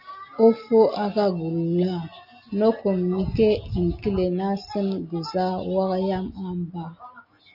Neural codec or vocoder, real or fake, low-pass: none; real; 5.4 kHz